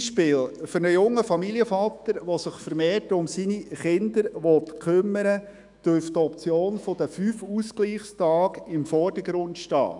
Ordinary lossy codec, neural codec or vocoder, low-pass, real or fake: none; autoencoder, 48 kHz, 128 numbers a frame, DAC-VAE, trained on Japanese speech; 10.8 kHz; fake